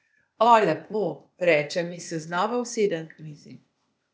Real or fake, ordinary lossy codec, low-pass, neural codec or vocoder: fake; none; none; codec, 16 kHz, 0.8 kbps, ZipCodec